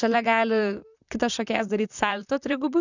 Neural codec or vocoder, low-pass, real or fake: vocoder, 44.1 kHz, 128 mel bands, Pupu-Vocoder; 7.2 kHz; fake